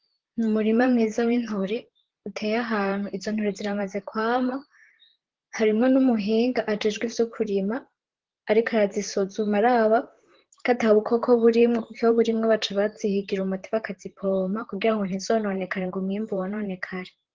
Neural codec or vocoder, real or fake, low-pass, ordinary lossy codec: vocoder, 44.1 kHz, 128 mel bands, Pupu-Vocoder; fake; 7.2 kHz; Opus, 16 kbps